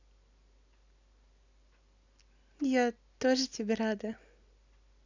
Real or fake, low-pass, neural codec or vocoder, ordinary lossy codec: real; 7.2 kHz; none; none